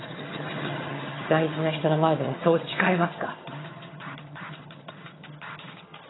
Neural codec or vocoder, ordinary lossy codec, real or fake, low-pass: vocoder, 22.05 kHz, 80 mel bands, HiFi-GAN; AAC, 16 kbps; fake; 7.2 kHz